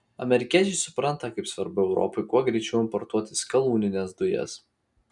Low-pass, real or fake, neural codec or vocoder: 10.8 kHz; real; none